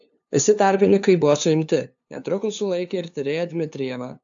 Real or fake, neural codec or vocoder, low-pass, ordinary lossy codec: fake; codec, 16 kHz, 2 kbps, FunCodec, trained on LibriTTS, 25 frames a second; 7.2 kHz; AAC, 64 kbps